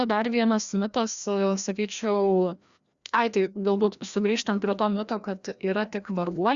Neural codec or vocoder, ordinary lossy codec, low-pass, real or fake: codec, 16 kHz, 1 kbps, FreqCodec, larger model; Opus, 64 kbps; 7.2 kHz; fake